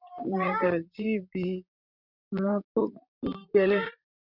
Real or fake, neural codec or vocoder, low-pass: fake; vocoder, 44.1 kHz, 128 mel bands, Pupu-Vocoder; 5.4 kHz